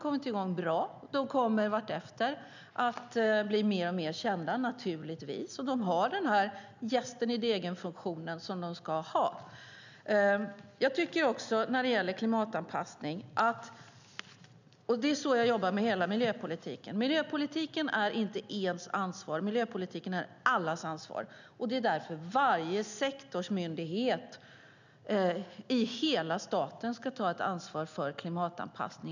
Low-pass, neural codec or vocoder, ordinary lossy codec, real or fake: 7.2 kHz; none; none; real